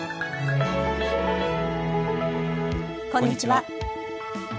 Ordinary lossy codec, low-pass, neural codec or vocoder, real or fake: none; none; none; real